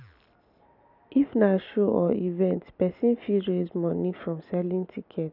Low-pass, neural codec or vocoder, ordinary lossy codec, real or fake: 5.4 kHz; none; none; real